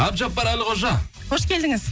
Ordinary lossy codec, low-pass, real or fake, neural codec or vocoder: none; none; real; none